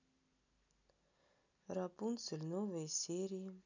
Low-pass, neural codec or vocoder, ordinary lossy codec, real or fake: 7.2 kHz; none; none; real